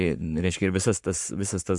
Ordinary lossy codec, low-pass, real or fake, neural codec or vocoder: MP3, 64 kbps; 10.8 kHz; fake; codec, 44.1 kHz, 7.8 kbps, Pupu-Codec